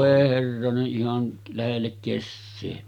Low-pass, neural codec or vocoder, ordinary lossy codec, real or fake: 19.8 kHz; none; none; real